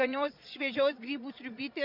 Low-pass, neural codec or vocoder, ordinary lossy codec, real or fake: 5.4 kHz; vocoder, 22.05 kHz, 80 mel bands, Vocos; Opus, 64 kbps; fake